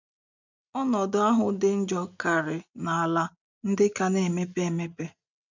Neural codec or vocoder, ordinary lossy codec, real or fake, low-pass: none; none; real; 7.2 kHz